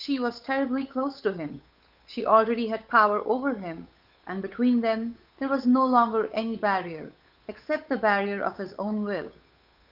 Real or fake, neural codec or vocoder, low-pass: fake; codec, 16 kHz, 8 kbps, FunCodec, trained on Chinese and English, 25 frames a second; 5.4 kHz